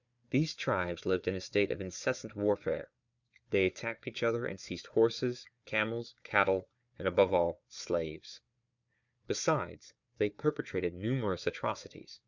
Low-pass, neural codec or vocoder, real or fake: 7.2 kHz; codec, 16 kHz, 4 kbps, FunCodec, trained on Chinese and English, 50 frames a second; fake